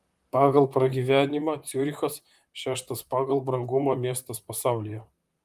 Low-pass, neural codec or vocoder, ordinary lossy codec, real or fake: 14.4 kHz; vocoder, 44.1 kHz, 128 mel bands, Pupu-Vocoder; Opus, 32 kbps; fake